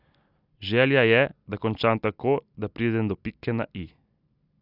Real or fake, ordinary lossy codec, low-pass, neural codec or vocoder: real; none; 5.4 kHz; none